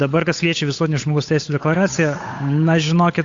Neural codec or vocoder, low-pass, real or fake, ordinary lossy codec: codec, 16 kHz, 8 kbps, FunCodec, trained on Chinese and English, 25 frames a second; 7.2 kHz; fake; AAC, 48 kbps